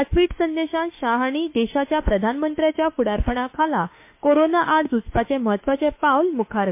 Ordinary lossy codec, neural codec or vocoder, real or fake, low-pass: MP3, 24 kbps; autoencoder, 48 kHz, 32 numbers a frame, DAC-VAE, trained on Japanese speech; fake; 3.6 kHz